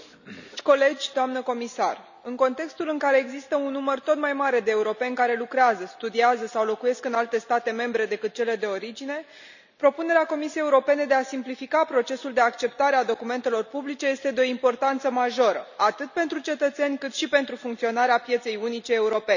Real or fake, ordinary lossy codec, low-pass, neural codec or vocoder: real; none; 7.2 kHz; none